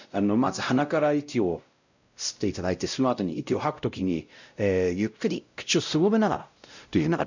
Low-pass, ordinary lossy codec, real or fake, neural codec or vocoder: 7.2 kHz; none; fake; codec, 16 kHz, 0.5 kbps, X-Codec, WavLM features, trained on Multilingual LibriSpeech